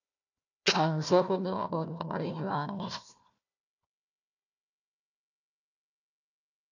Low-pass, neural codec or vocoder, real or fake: 7.2 kHz; codec, 16 kHz, 1 kbps, FunCodec, trained on Chinese and English, 50 frames a second; fake